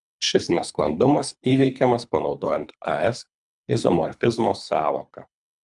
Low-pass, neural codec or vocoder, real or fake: 10.8 kHz; codec, 24 kHz, 3 kbps, HILCodec; fake